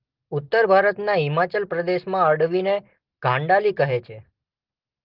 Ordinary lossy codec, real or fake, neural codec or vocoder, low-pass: Opus, 16 kbps; real; none; 5.4 kHz